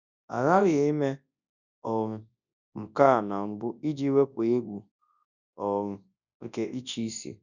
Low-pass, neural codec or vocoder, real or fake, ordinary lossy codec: 7.2 kHz; codec, 24 kHz, 0.9 kbps, WavTokenizer, large speech release; fake; none